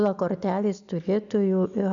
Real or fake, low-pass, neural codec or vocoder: real; 7.2 kHz; none